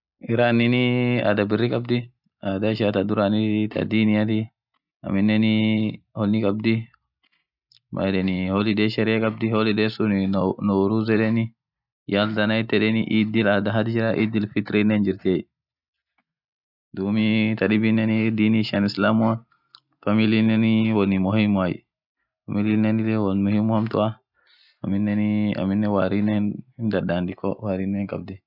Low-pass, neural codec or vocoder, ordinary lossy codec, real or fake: 5.4 kHz; none; none; real